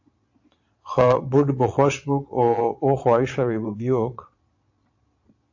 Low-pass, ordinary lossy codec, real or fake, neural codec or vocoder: 7.2 kHz; AAC, 48 kbps; fake; vocoder, 22.05 kHz, 80 mel bands, Vocos